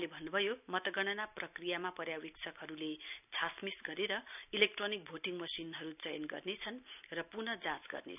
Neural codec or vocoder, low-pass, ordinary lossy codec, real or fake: none; 3.6 kHz; none; real